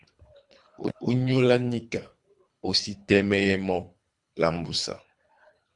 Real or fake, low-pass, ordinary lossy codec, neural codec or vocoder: fake; 10.8 kHz; Opus, 64 kbps; codec, 24 kHz, 3 kbps, HILCodec